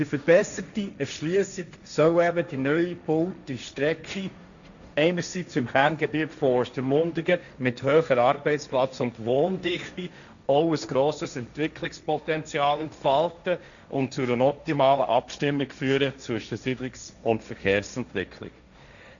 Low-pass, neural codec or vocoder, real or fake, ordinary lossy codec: 7.2 kHz; codec, 16 kHz, 1.1 kbps, Voila-Tokenizer; fake; none